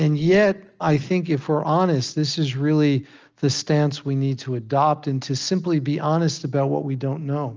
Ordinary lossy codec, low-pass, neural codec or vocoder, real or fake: Opus, 24 kbps; 7.2 kHz; none; real